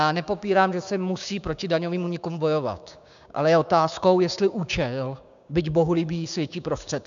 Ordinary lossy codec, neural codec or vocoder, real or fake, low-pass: MP3, 96 kbps; codec, 16 kHz, 6 kbps, DAC; fake; 7.2 kHz